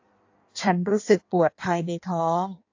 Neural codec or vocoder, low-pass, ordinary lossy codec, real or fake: codec, 16 kHz in and 24 kHz out, 1.1 kbps, FireRedTTS-2 codec; 7.2 kHz; AAC, 48 kbps; fake